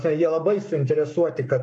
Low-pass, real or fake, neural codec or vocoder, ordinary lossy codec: 10.8 kHz; fake; codec, 44.1 kHz, 7.8 kbps, Pupu-Codec; MP3, 48 kbps